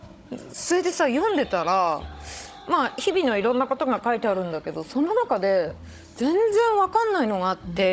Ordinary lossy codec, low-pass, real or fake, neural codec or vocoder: none; none; fake; codec, 16 kHz, 16 kbps, FunCodec, trained on Chinese and English, 50 frames a second